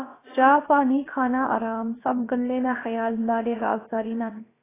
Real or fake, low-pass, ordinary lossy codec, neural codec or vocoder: fake; 3.6 kHz; AAC, 16 kbps; codec, 16 kHz, about 1 kbps, DyCAST, with the encoder's durations